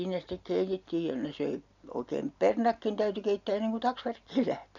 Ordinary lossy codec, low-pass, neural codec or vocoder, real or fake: none; 7.2 kHz; none; real